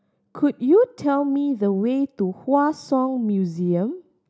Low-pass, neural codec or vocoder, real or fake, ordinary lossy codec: none; none; real; none